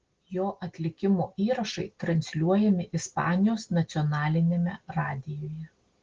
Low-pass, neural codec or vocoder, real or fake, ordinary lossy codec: 7.2 kHz; none; real; Opus, 16 kbps